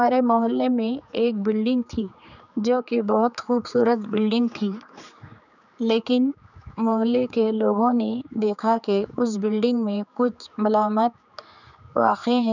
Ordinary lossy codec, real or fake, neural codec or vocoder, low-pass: none; fake; codec, 16 kHz, 4 kbps, X-Codec, HuBERT features, trained on general audio; 7.2 kHz